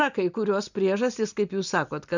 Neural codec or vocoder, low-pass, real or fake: none; 7.2 kHz; real